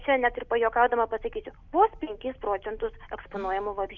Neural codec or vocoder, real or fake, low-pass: none; real; 7.2 kHz